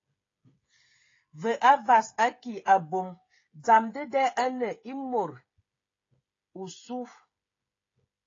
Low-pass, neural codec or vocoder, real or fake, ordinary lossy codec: 7.2 kHz; codec, 16 kHz, 16 kbps, FreqCodec, smaller model; fake; AAC, 32 kbps